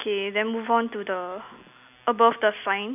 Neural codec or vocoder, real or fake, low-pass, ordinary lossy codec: none; real; 3.6 kHz; none